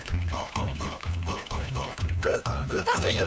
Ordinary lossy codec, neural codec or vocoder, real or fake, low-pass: none; codec, 16 kHz, 1 kbps, FunCodec, trained on LibriTTS, 50 frames a second; fake; none